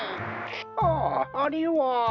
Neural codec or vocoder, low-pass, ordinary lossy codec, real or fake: none; 7.2 kHz; none; real